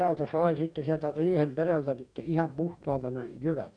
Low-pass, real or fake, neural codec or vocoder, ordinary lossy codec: 9.9 kHz; fake; codec, 44.1 kHz, 2.6 kbps, DAC; none